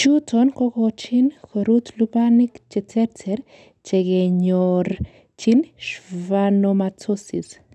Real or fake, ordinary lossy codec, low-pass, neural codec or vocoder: real; none; none; none